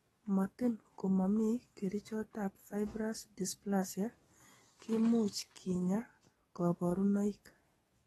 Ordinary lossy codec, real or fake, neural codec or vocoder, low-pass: AAC, 32 kbps; fake; codec, 44.1 kHz, 7.8 kbps, DAC; 19.8 kHz